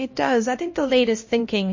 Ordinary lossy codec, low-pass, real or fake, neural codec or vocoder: MP3, 32 kbps; 7.2 kHz; fake; codec, 16 kHz, 1 kbps, X-Codec, HuBERT features, trained on LibriSpeech